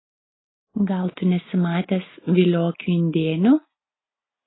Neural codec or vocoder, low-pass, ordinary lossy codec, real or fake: none; 7.2 kHz; AAC, 16 kbps; real